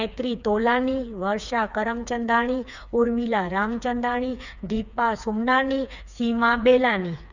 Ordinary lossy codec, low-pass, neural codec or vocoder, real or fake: none; 7.2 kHz; codec, 16 kHz, 4 kbps, FreqCodec, smaller model; fake